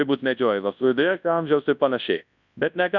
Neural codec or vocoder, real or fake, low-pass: codec, 24 kHz, 0.9 kbps, WavTokenizer, large speech release; fake; 7.2 kHz